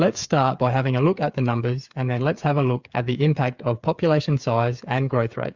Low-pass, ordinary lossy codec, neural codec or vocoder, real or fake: 7.2 kHz; Opus, 64 kbps; codec, 16 kHz, 8 kbps, FreqCodec, smaller model; fake